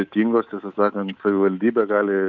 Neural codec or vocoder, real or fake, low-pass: codec, 24 kHz, 3.1 kbps, DualCodec; fake; 7.2 kHz